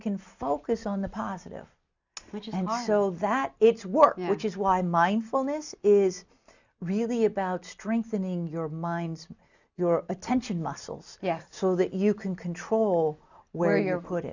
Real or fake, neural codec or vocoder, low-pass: real; none; 7.2 kHz